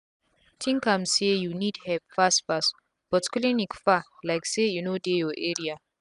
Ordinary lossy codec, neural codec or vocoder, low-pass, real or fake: none; none; 10.8 kHz; real